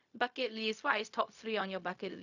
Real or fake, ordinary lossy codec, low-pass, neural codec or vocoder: fake; none; 7.2 kHz; codec, 16 kHz, 0.4 kbps, LongCat-Audio-Codec